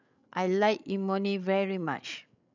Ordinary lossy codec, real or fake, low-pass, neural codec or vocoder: none; fake; 7.2 kHz; codec, 16 kHz, 8 kbps, FreqCodec, larger model